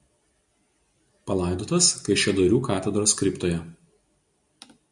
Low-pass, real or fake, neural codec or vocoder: 10.8 kHz; real; none